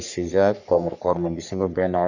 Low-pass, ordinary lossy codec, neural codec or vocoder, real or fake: 7.2 kHz; none; codec, 44.1 kHz, 3.4 kbps, Pupu-Codec; fake